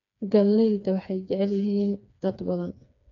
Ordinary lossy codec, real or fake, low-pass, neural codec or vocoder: none; fake; 7.2 kHz; codec, 16 kHz, 4 kbps, FreqCodec, smaller model